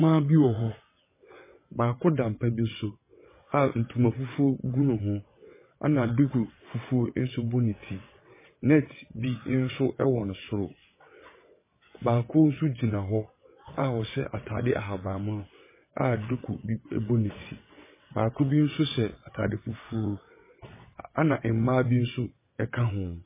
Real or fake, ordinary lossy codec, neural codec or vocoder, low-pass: fake; MP3, 16 kbps; vocoder, 22.05 kHz, 80 mel bands, WaveNeXt; 3.6 kHz